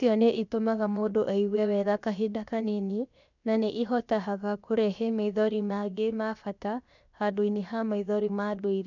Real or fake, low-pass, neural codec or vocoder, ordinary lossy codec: fake; 7.2 kHz; codec, 16 kHz, 0.7 kbps, FocalCodec; none